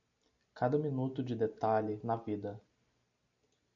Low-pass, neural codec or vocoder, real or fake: 7.2 kHz; none; real